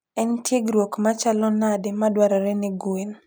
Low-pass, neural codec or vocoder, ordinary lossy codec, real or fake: none; none; none; real